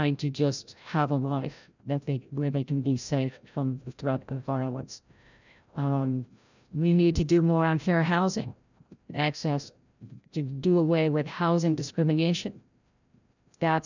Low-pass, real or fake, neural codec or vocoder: 7.2 kHz; fake; codec, 16 kHz, 0.5 kbps, FreqCodec, larger model